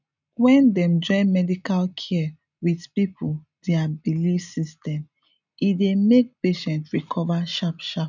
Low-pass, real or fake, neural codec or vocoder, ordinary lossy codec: 7.2 kHz; real; none; none